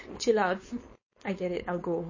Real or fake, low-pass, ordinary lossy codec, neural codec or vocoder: fake; 7.2 kHz; MP3, 32 kbps; codec, 16 kHz, 4.8 kbps, FACodec